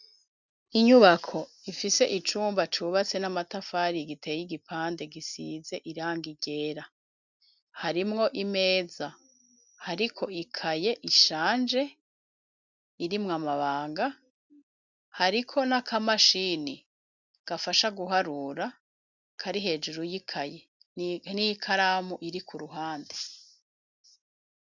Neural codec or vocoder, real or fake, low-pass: none; real; 7.2 kHz